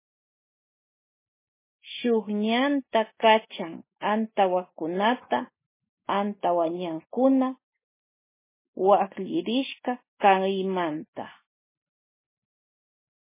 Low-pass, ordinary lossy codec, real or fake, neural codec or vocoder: 3.6 kHz; MP3, 16 kbps; real; none